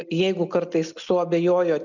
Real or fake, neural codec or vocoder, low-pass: real; none; 7.2 kHz